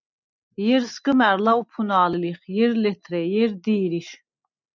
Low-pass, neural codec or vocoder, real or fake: 7.2 kHz; none; real